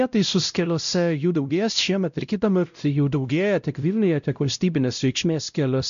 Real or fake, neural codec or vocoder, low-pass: fake; codec, 16 kHz, 0.5 kbps, X-Codec, WavLM features, trained on Multilingual LibriSpeech; 7.2 kHz